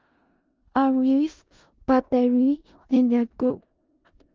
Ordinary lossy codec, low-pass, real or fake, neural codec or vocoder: Opus, 24 kbps; 7.2 kHz; fake; codec, 16 kHz in and 24 kHz out, 0.4 kbps, LongCat-Audio-Codec, four codebook decoder